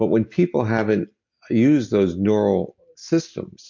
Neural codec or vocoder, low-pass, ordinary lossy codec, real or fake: none; 7.2 kHz; MP3, 48 kbps; real